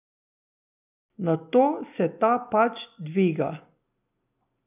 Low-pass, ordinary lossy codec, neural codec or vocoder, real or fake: 3.6 kHz; none; none; real